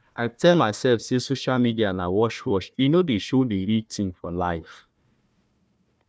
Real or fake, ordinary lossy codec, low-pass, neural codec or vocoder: fake; none; none; codec, 16 kHz, 1 kbps, FunCodec, trained on Chinese and English, 50 frames a second